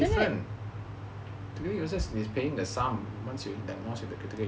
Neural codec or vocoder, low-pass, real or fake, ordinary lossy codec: none; none; real; none